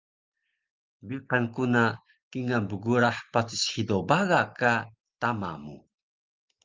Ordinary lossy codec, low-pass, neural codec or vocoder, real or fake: Opus, 24 kbps; 7.2 kHz; none; real